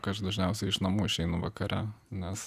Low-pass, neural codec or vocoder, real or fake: 14.4 kHz; none; real